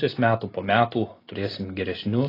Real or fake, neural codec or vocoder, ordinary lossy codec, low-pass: real; none; AAC, 24 kbps; 5.4 kHz